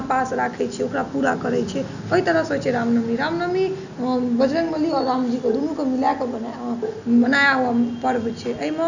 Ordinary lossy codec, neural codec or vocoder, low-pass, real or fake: none; none; 7.2 kHz; real